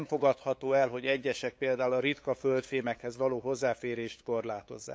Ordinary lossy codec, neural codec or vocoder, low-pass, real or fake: none; codec, 16 kHz, 8 kbps, FunCodec, trained on LibriTTS, 25 frames a second; none; fake